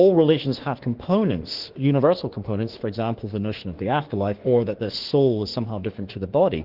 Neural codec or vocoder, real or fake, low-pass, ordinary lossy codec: autoencoder, 48 kHz, 32 numbers a frame, DAC-VAE, trained on Japanese speech; fake; 5.4 kHz; Opus, 16 kbps